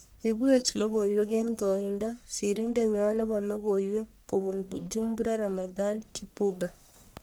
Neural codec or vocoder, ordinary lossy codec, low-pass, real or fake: codec, 44.1 kHz, 1.7 kbps, Pupu-Codec; none; none; fake